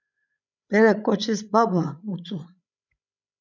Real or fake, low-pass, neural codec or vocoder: fake; 7.2 kHz; codec, 16 kHz, 16 kbps, FreqCodec, larger model